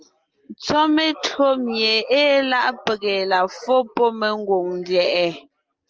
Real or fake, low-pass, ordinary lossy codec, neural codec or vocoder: real; 7.2 kHz; Opus, 24 kbps; none